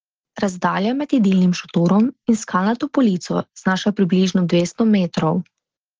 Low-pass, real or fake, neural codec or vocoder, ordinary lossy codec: 7.2 kHz; real; none; Opus, 16 kbps